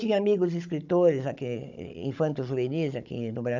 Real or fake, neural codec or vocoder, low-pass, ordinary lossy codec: fake; codec, 16 kHz, 16 kbps, FunCodec, trained on Chinese and English, 50 frames a second; 7.2 kHz; none